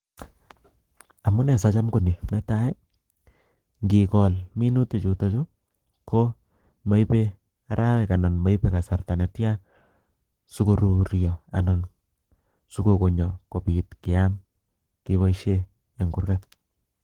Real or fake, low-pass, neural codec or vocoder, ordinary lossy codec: fake; 19.8 kHz; codec, 44.1 kHz, 7.8 kbps, Pupu-Codec; Opus, 16 kbps